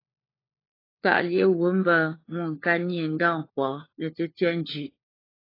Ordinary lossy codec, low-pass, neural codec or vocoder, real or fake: AAC, 24 kbps; 5.4 kHz; codec, 16 kHz, 4 kbps, FunCodec, trained on LibriTTS, 50 frames a second; fake